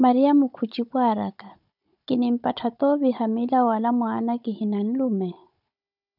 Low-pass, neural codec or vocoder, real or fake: 5.4 kHz; codec, 16 kHz, 16 kbps, FunCodec, trained on Chinese and English, 50 frames a second; fake